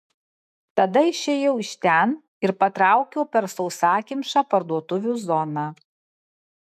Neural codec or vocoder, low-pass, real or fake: autoencoder, 48 kHz, 128 numbers a frame, DAC-VAE, trained on Japanese speech; 14.4 kHz; fake